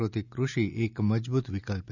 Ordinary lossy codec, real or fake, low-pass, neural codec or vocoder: none; real; 7.2 kHz; none